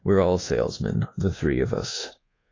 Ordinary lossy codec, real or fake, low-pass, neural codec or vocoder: AAC, 32 kbps; fake; 7.2 kHz; codec, 24 kHz, 1.2 kbps, DualCodec